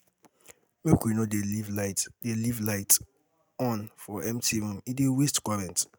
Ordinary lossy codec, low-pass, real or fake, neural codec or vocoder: none; none; real; none